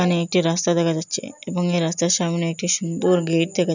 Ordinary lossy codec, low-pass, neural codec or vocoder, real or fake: none; 7.2 kHz; none; real